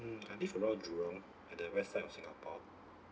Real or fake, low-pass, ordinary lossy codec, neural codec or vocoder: real; none; none; none